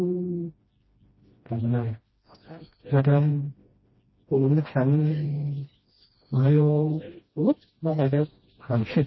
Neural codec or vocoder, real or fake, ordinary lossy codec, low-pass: codec, 16 kHz, 1 kbps, FreqCodec, smaller model; fake; MP3, 24 kbps; 7.2 kHz